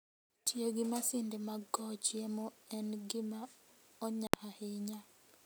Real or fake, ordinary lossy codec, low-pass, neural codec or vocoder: real; none; none; none